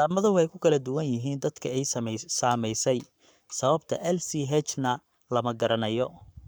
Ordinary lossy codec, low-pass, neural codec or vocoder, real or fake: none; none; codec, 44.1 kHz, 7.8 kbps, Pupu-Codec; fake